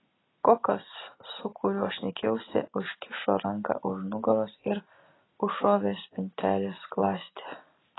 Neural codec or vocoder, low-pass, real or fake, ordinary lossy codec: none; 7.2 kHz; real; AAC, 16 kbps